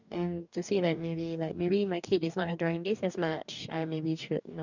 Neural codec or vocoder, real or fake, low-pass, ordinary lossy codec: codec, 44.1 kHz, 2.6 kbps, DAC; fake; 7.2 kHz; none